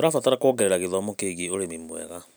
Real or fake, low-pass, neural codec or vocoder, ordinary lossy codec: real; none; none; none